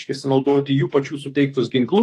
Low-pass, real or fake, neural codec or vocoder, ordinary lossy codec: 14.4 kHz; fake; autoencoder, 48 kHz, 32 numbers a frame, DAC-VAE, trained on Japanese speech; AAC, 48 kbps